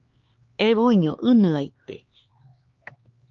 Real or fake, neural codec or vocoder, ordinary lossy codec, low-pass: fake; codec, 16 kHz, 2 kbps, X-Codec, HuBERT features, trained on LibriSpeech; Opus, 32 kbps; 7.2 kHz